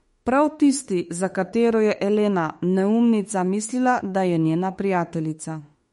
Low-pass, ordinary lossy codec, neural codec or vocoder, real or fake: 19.8 kHz; MP3, 48 kbps; autoencoder, 48 kHz, 32 numbers a frame, DAC-VAE, trained on Japanese speech; fake